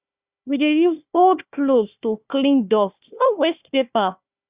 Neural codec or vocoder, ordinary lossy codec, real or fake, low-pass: codec, 16 kHz, 1 kbps, FunCodec, trained on Chinese and English, 50 frames a second; Opus, 64 kbps; fake; 3.6 kHz